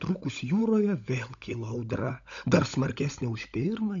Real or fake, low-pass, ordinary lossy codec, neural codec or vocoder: fake; 7.2 kHz; MP3, 64 kbps; codec, 16 kHz, 16 kbps, FunCodec, trained on LibriTTS, 50 frames a second